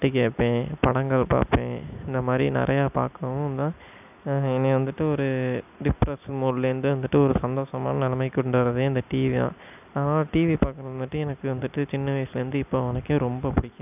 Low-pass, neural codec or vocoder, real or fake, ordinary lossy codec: 3.6 kHz; none; real; none